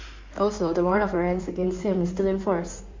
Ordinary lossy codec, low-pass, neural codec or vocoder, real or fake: MP3, 48 kbps; 7.2 kHz; codec, 16 kHz in and 24 kHz out, 2.2 kbps, FireRedTTS-2 codec; fake